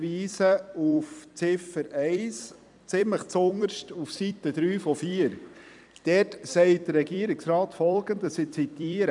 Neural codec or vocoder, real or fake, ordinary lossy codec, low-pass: vocoder, 44.1 kHz, 128 mel bands every 256 samples, BigVGAN v2; fake; none; 10.8 kHz